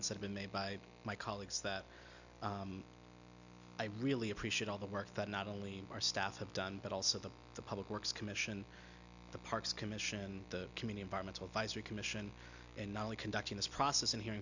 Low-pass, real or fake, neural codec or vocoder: 7.2 kHz; real; none